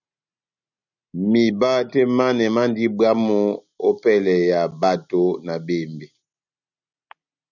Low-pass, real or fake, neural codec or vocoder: 7.2 kHz; real; none